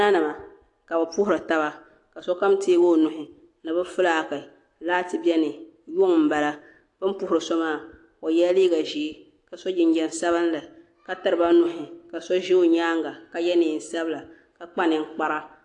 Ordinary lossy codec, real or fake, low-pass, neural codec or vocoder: AAC, 48 kbps; real; 10.8 kHz; none